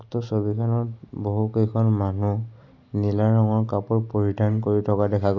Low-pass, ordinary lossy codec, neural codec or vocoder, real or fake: 7.2 kHz; none; none; real